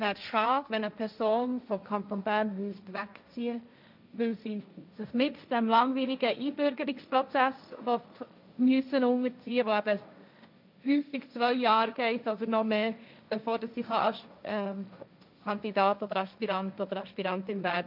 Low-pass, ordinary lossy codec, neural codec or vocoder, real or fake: 5.4 kHz; none; codec, 16 kHz, 1.1 kbps, Voila-Tokenizer; fake